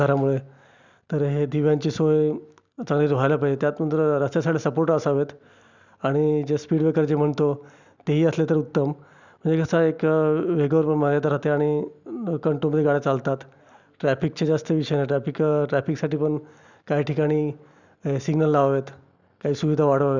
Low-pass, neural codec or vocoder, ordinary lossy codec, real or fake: 7.2 kHz; none; none; real